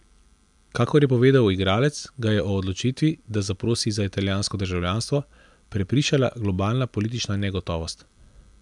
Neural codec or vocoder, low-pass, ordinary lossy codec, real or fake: none; 10.8 kHz; none; real